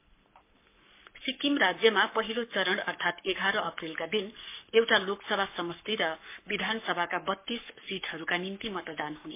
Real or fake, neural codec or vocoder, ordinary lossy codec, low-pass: fake; codec, 44.1 kHz, 7.8 kbps, Pupu-Codec; MP3, 24 kbps; 3.6 kHz